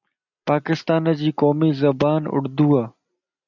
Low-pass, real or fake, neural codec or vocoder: 7.2 kHz; real; none